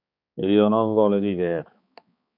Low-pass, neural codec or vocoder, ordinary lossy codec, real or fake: 5.4 kHz; codec, 16 kHz, 4 kbps, X-Codec, HuBERT features, trained on balanced general audio; MP3, 48 kbps; fake